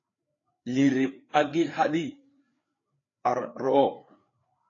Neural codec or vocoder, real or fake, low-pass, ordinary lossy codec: codec, 16 kHz, 4 kbps, FreqCodec, larger model; fake; 7.2 kHz; AAC, 32 kbps